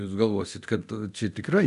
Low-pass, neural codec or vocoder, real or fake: 10.8 kHz; codec, 24 kHz, 0.9 kbps, DualCodec; fake